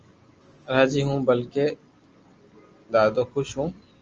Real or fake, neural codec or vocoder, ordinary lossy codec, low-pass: real; none; Opus, 24 kbps; 7.2 kHz